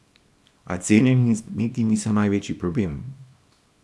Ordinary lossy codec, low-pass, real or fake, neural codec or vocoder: none; none; fake; codec, 24 kHz, 0.9 kbps, WavTokenizer, small release